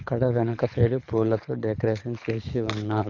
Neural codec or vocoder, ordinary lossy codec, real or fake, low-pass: codec, 24 kHz, 6 kbps, HILCodec; none; fake; 7.2 kHz